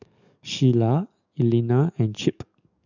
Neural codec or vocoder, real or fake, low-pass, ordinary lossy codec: codec, 44.1 kHz, 7.8 kbps, DAC; fake; 7.2 kHz; none